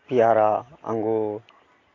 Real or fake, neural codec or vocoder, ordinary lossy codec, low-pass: real; none; AAC, 32 kbps; 7.2 kHz